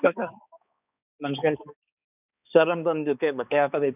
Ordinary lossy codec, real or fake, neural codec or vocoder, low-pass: none; fake; codec, 16 kHz, 2 kbps, X-Codec, HuBERT features, trained on balanced general audio; 3.6 kHz